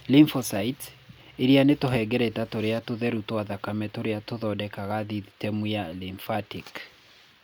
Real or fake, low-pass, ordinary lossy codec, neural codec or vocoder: real; none; none; none